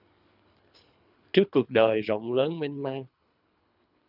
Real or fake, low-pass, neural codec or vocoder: fake; 5.4 kHz; codec, 24 kHz, 3 kbps, HILCodec